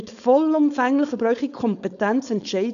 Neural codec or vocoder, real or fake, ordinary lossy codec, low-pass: codec, 16 kHz, 4.8 kbps, FACodec; fake; none; 7.2 kHz